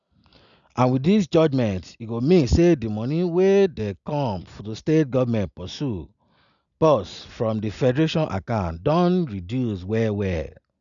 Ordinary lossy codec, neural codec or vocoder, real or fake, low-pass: none; none; real; 7.2 kHz